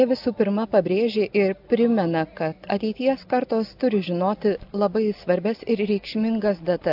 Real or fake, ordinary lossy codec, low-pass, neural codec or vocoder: real; AAC, 48 kbps; 5.4 kHz; none